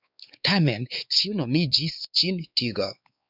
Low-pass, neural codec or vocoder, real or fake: 5.4 kHz; codec, 16 kHz, 4 kbps, X-Codec, WavLM features, trained on Multilingual LibriSpeech; fake